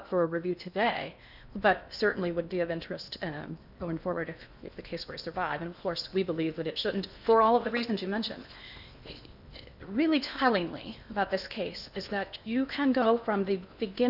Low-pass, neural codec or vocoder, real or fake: 5.4 kHz; codec, 16 kHz in and 24 kHz out, 0.8 kbps, FocalCodec, streaming, 65536 codes; fake